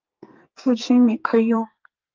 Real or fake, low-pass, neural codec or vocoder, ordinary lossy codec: fake; 7.2 kHz; codec, 44.1 kHz, 2.6 kbps, SNAC; Opus, 24 kbps